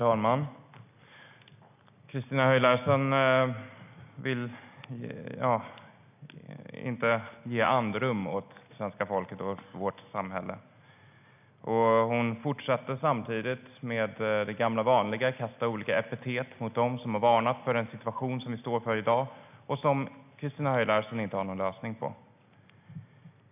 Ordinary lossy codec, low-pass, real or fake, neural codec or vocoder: none; 3.6 kHz; real; none